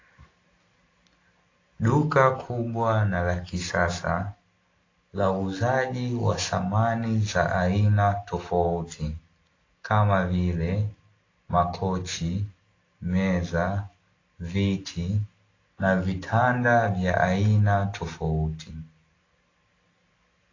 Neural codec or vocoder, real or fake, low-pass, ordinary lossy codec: none; real; 7.2 kHz; AAC, 32 kbps